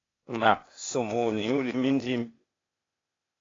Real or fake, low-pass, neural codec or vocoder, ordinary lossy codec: fake; 7.2 kHz; codec, 16 kHz, 0.8 kbps, ZipCodec; AAC, 32 kbps